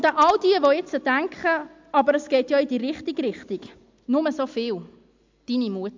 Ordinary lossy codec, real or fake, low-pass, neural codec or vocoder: MP3, 64 kbps; real; 7.2 kHz; none